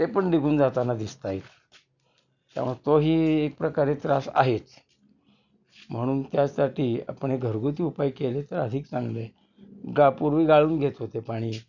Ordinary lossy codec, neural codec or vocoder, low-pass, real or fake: none; none; 7.2 kHz; real